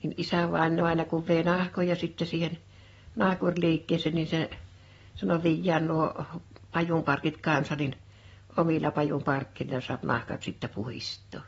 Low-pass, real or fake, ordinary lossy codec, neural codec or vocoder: 19.8 kHz; fake; AAC, 24 kbps; vocoder, 44.1 kHz, 128 mel bands every 512 samples, BigVGAN v2